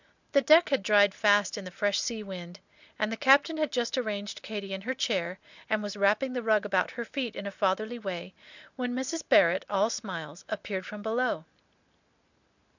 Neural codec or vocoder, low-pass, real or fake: none; 7.2 kHz; real